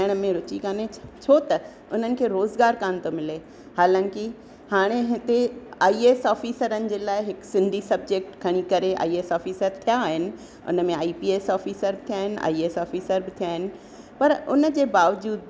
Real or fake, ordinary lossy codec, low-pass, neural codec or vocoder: real; none; none; none